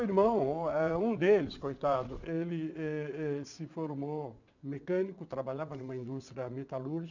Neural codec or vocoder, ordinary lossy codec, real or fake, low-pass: vocoder, 22.05 kHz, 80 mel bands, WaveNeXt; none; fake; 7.2 kHz